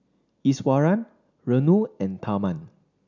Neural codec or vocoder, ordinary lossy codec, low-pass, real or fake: none; none; 7.2 kHz; real